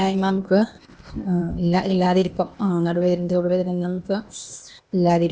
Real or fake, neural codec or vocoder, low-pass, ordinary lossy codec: fake; codec, 16 kHz, 0.8 kbps, ZipCodec; none; none